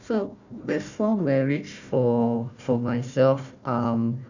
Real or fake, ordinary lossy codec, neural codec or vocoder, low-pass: fake; none; codec, 16 kHz, 1 kbps, FunCodec, trained on Chinese and English, 50 frames a second; 7.2 kHz